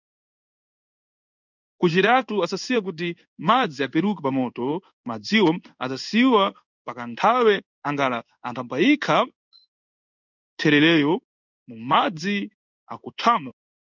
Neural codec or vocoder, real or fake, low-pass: codec, 16 kHz in and 24 kHz out, 1 kbps, XY-Tokenizer; fake; 7.2 kHz